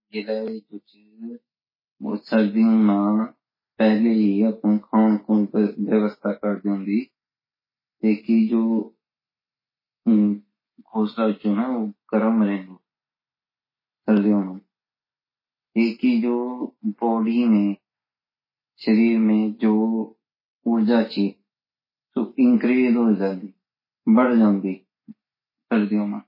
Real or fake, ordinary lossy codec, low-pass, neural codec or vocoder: real; MP3, 24 kbps; 5.4 kHz; none